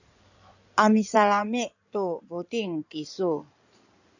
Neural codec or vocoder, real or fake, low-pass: codec, 16 kHz in and 24 kHz out, 2.2 kbps, FireRedTTS-2 codec; fake; 7.2 kHz